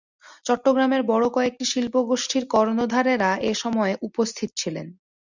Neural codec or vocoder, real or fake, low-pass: none; real; 7.2 kHz